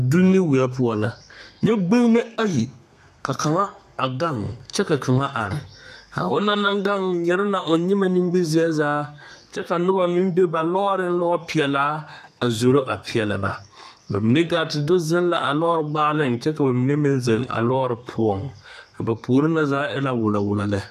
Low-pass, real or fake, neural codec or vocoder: 14.4 kHz; fake; codec, 32 kHz, 1.9 kbps, SNAC